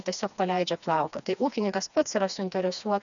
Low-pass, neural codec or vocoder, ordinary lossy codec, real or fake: 7.2 kHz; codec, 16 kHz, 2 kbps, FreqCodec, smaller model; MP3, 96 kbps; fake